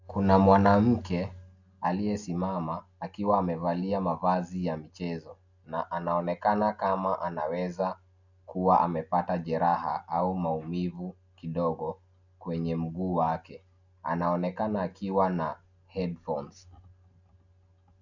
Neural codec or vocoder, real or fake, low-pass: none; real; 7.2 kHz